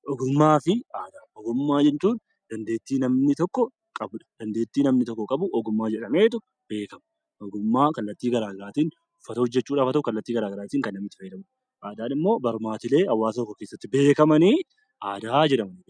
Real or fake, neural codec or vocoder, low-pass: real; none; 9.9 kHz